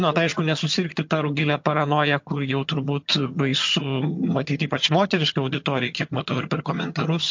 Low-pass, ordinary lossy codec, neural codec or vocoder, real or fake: 7.2 kHz; MP3, 48 kbps; vocoder, 22.05 kHz, 80 mel bands, HiFi-GAN; fake